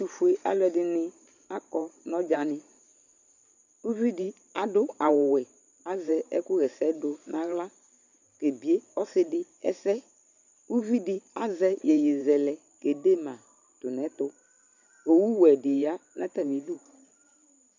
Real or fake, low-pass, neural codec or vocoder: fake; 7.2 kHz; vocoder, 44.1 kHz, 128 mel bands every 512 samples, BigVGAN v2